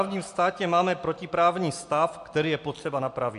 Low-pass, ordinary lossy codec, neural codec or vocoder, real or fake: 10.8 kHz; MP3, 64 kbps; none; real